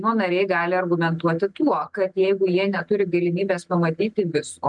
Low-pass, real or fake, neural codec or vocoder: 10.8 kHz; real; none